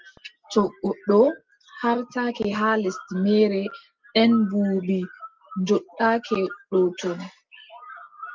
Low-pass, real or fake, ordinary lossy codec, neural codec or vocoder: 7.2 kHz; real; Opus, 24 kbps; none